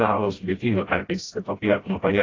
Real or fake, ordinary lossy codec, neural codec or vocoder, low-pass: fake; AAC, 32 kbps; codec, 16 kHz, 0.5 kbps, FreqCodec, smaller model; 7.2 kHz